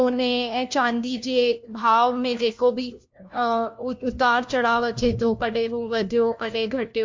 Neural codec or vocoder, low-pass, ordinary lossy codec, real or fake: codec, 16 kHz, 1 kbps, FunCodec, trained on LibriTTS, 50 frames a second; 7.2 kHz; MP3, 48 kbps; fake